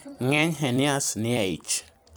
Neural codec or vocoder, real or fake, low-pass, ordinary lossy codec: vocoder, 44.1 kHz, 128 mel bands every 256 samples, BigVGAN v2; fake; none; none